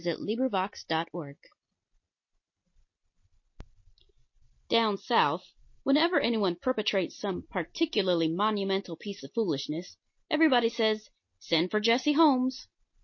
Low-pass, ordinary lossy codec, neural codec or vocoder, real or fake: 7.2 kHz; MP3, 32 kbps; none; real